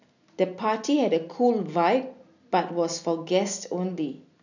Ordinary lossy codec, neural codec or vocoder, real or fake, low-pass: none; none; real; 7.2 kHz